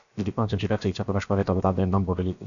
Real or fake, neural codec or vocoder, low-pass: fake; codec, 16 kHz, about 1 kbps, DyCAST, with the encoder's durations; 7.2 kHz